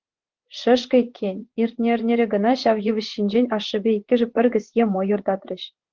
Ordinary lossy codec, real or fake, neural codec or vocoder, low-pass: Opus, 16 kbps; fake; vocoder, 24 kHz, 100 mel bands, Vocos; 7.2 kHz